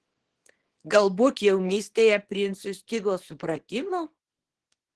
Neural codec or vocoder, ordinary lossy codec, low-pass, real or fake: codec, 24 kHz, 0.9 kbps, WavTokenizer, small release; Opus, 16 kbps; 10.8 kHz; fake